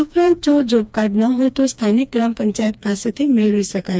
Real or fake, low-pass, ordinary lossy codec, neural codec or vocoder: fake; none; none; codec, 16 kHz, 2 kbps, FreqCodec, smaller model